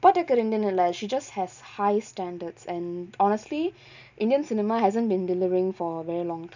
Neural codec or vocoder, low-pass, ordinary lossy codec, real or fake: none; 7.2 kHz; none; real